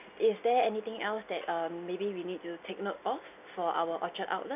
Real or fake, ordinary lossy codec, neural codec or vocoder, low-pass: real; none; none; 3.6 kHz